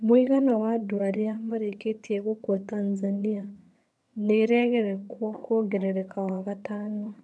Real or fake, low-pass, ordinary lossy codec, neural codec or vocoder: fake; none; none; vocoder, 22.05 kHz, 80 mel bands, HiFi-GAN